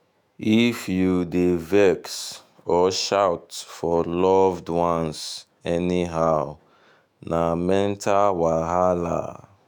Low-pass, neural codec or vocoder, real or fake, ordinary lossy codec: none; autoencoder, 48 kHz, 128 numbers a frame, DAC-VAE, trained on Japanese speech; fake; none